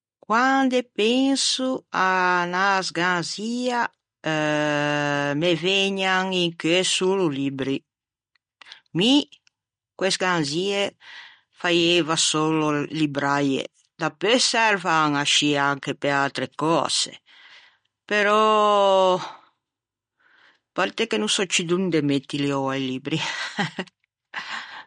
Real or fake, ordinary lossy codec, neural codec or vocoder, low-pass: real; MP3, 48 kbps; none; 9.9 kHz